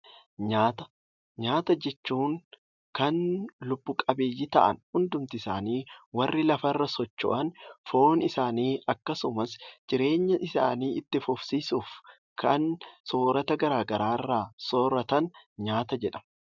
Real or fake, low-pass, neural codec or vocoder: real; 7.2 kHz; none